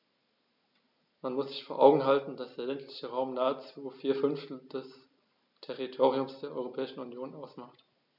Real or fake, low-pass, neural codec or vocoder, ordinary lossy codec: real; 5.4 kHz; none; none